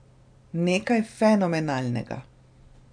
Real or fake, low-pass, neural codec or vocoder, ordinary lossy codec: real; 9.9 kHz; none; none